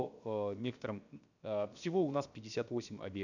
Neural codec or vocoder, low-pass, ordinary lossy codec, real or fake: codec, 16 kHz, 0.7 kbps, FocalCodec; 7.2 kHz; none; fake